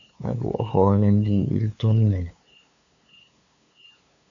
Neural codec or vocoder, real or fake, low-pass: codec, 16 kHz, 2 kbps, FunCodec, trained on LibriTTS, 25 frames a second; fake; 7.2 kHz